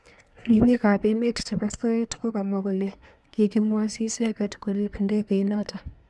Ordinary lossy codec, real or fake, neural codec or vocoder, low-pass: none; fake; codec, 24 kHz, 1 kbps, SNAC; none